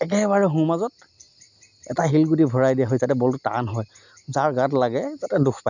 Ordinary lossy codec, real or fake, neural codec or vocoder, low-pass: none; real; none; 7.2 kHz